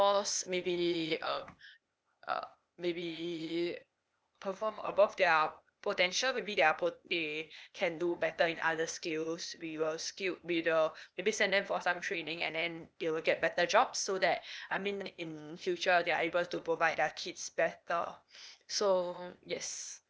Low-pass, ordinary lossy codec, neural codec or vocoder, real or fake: none; none; codec, 16 kHz, 0.8 kbps, ZipCodec; fake